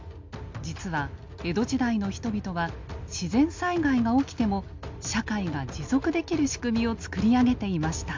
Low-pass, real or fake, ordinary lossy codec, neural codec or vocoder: 7.2 kHz; real; MP3, 64 kbps; none